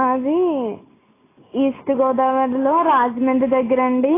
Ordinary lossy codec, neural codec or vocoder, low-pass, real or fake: AAC, 16 kbps; none; 3.6 kHz; real